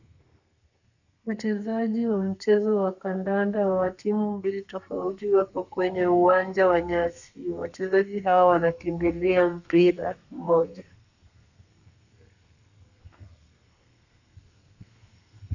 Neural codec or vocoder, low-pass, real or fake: codec, 32 kHz, 1.9 kbps, SNAC; 7.2 kHz; fake